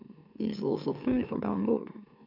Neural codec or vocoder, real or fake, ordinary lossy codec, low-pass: autoencoder, 44.1 kHz, a latent of 192 numbers a frame, MeloTTS; fake; MP3, 48 kbps; 5.4 kHz